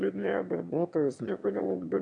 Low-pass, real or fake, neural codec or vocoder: 9.9 kHz; fake; autoencoder, 22.05 kHz, a latent of 192 numbers a frame, VITS, trained on one speaker